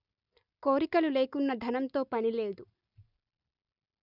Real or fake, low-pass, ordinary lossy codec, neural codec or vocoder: real; 5.4 kHz; none; none